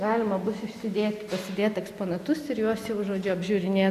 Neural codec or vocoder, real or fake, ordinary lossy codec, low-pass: none; real; MP3, 96 kbps; 14.4 kHz